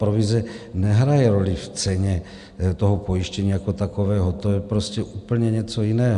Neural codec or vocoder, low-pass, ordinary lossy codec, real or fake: none; 10.8 kHz; AAC, 96 kbps; real